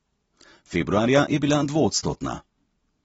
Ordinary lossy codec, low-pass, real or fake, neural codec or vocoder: AAC, 24 kbps; 10.8 kHz; real; none